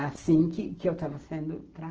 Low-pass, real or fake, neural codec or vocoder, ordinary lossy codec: 7.2 kHz; real; none; Opus, 16 kbps